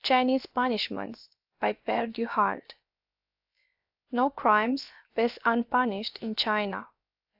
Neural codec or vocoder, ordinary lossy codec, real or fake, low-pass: codec, 16 kHz, about 1 kbps, DyCAST, with the encoder's durations; AAC, 48 kbps; fake; 5.4 kHz